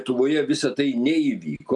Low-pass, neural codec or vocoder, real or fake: 10.8 kHz; none; real